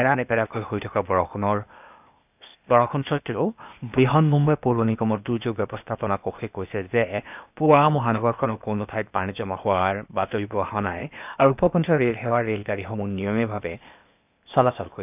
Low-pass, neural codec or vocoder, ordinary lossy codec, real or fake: 3.6 kHz; codec, 16 kHz, 0.8 kbps, ZipCodec; none; fake